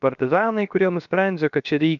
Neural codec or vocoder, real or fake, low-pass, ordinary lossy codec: codec, 16 kHz, 0.7 kbps, FocalCodec; fake; 7.2 kHz; MP3, 96 kbps